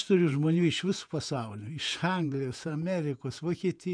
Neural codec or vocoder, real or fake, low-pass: vocoder, 48 kHz, 128 mel bands, Vocos; fake; 9.9 kHz